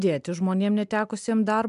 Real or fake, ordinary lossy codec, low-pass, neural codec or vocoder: real; MP3, 96 kbps; 10.8 kHz; none